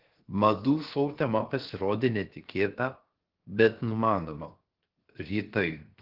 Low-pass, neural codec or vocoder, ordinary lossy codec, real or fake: 5.4 kHz; codec, 16 kHz, 0.7 kbps, FocalCodec; Opus, 16 kbps; fake